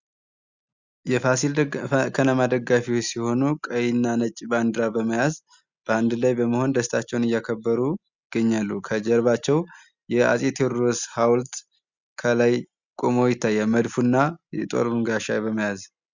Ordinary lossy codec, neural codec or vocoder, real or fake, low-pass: Opus, 64 kbps; none; real; 7.2 kHz